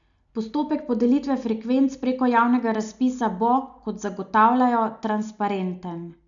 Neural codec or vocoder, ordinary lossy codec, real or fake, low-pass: none; none; real; 7.2 kHz